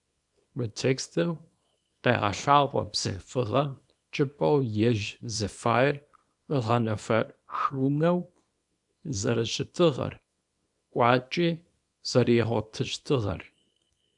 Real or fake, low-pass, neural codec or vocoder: fake; 10.8 kHz; codec, 24 kHz, 0.9 kbps, WavTokenizer, small release